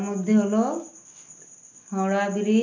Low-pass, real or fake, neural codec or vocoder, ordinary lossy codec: 7.2 kHz; real; none; none